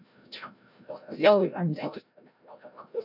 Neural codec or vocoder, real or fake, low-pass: codec, 16 kHz, 0.5 kbps, FreqCodec, larger model; fake; 5.4 kHz